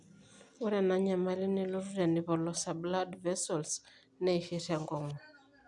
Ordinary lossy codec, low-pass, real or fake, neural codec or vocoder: none; 10.8 kHz; real; none